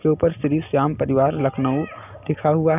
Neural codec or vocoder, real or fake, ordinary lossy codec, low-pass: none; real; none; 3.6 kHz